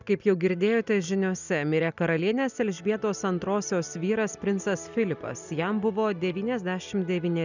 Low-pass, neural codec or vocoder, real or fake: 7.2 kHz; none; real